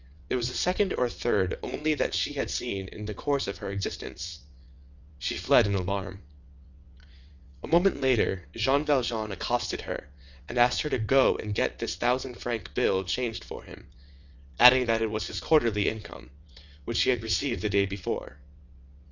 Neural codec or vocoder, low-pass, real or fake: vocoder, 22.05 kHz, 80 mel bands, WaveNeXt; 7.2 kHz; fake